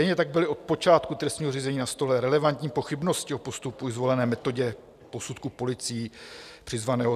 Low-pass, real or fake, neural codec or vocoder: 14.4 kHz; real; none